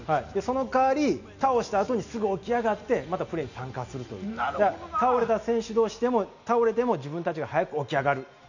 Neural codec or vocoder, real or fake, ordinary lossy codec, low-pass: none; real; none; 7.2 kHz